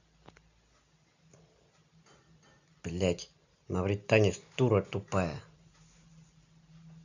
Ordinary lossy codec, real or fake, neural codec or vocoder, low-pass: none; real; none; 7.2 kHz